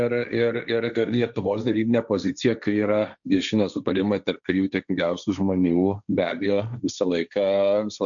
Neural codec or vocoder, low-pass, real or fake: codec, 16 kHz, 1.1 kbps, Voila-Tokenizer; 7.2 kHz; fake